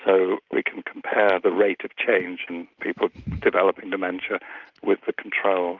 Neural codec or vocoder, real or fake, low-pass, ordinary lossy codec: none; real; 7.2 kHz; Opus, 32 kbps